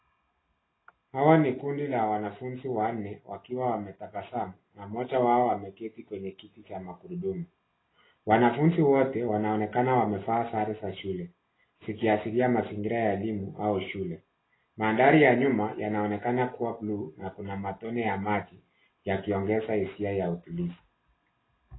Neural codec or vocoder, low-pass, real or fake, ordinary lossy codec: none; 7.2 kHz; real; AAC, 16 kbps